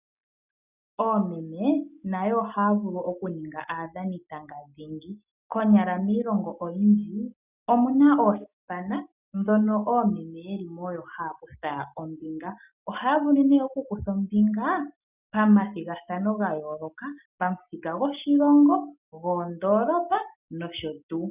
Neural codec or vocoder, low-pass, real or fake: none; 3.6 kHz; real